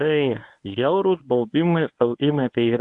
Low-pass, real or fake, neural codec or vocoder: 10.8 kHz; fake; codec, 24 kHz, 0.9 kbps, WavTokenizer, medium speech release version 1